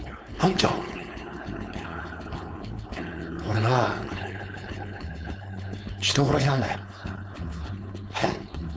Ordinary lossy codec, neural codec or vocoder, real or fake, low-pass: none; codec, 16 kHz, 4.8 kbps, FACodec; fake; none